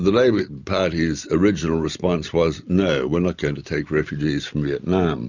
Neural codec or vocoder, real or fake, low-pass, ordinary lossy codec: none; real; 7.2 kHz; Opus, 64 kbps